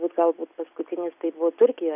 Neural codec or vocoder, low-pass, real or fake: none; 3.6 kHz; real